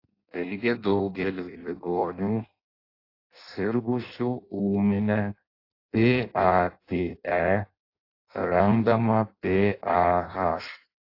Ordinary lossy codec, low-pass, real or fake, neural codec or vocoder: AAC, 32 kbps; 5.4 kHz; fake; codec, 16 kHz in and 24 kHz out, 0.6 kbps, FireRedTTS-2 codec